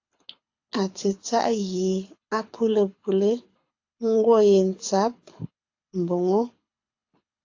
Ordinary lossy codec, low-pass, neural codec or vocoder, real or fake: AAC, 48 kbps; 7.2 kHz; codec, 24 kHz, 6 kbps, HILCodec; fake